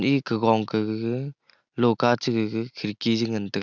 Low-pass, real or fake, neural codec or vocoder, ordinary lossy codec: 7.2 kHz; real; none; none